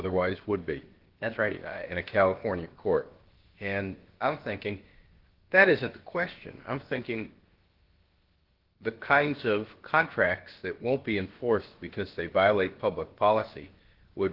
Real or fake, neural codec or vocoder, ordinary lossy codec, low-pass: fake; codec, 16 kHz, about 1 kbps, DyCAST, with the encoder's durations; Opus, 16 kbps; 5.4 kHz